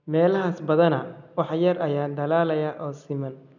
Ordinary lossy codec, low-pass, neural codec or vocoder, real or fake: none; 7.2 kHz; none; real